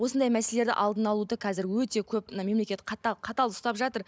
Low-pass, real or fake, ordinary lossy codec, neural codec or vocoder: none; real; none; none